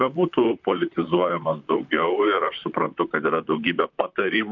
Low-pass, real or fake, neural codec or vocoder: 7.2 kHz; fake; vocoder, 44.1 kHz, 128 mel bands, Pupu-Vocoder